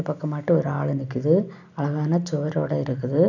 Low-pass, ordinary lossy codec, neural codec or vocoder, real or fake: 7.2 kHz; none; none; real